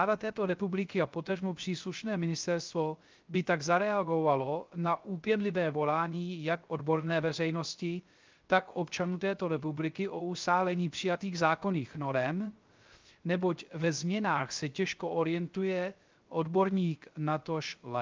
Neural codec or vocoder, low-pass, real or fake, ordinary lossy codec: codec, 16 kHz, 0.3 kbps, FocalCodec; 7.2 kHz; fake; Opus, 24 kbps